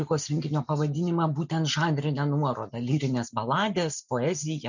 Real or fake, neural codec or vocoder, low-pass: real; none; 7.2 kHz